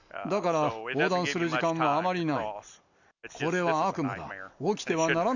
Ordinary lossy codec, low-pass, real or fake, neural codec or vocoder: none; 7.2 kHz; real; none